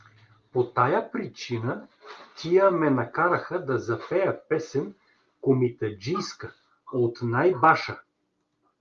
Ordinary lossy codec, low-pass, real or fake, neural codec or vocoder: Opus, 32 kbps; 7.2 kHz; real; none